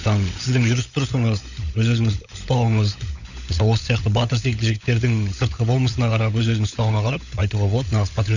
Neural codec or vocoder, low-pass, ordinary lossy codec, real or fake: codec, 16 kHz, 16 kbps, FunCodec, trained on LibriTTS, 50 frames a second; 7.2 kHz; none; fake